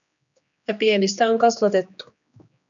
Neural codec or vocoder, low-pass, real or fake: codec, 16 kHz, 2 kbps, X-Codec, HuBERT features, trained on general audio; 7.2 kHz; fake